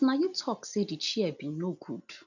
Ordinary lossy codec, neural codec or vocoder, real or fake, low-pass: none; none; real; 7.2 kHz